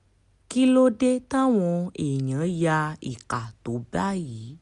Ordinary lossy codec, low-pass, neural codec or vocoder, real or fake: none; 10.8 kHz; none; real